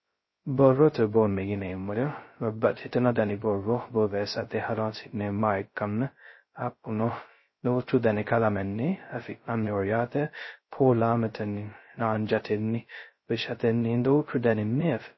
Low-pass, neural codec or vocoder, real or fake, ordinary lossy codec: 7.2 kHz; codec, 16 kHz, 0.2 kbps, FocalCodec; fake; MP3, 24 kbps